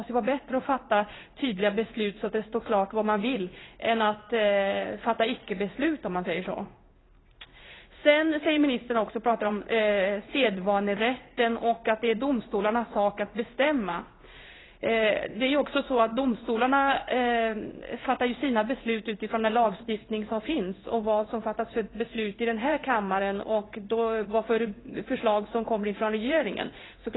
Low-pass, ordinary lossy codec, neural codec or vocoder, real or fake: 7.2 kHz; AAC, 16 kbps; codec, 16 kHz in and 24 kHz out, 1 kbps, XY-Tokenizer; fake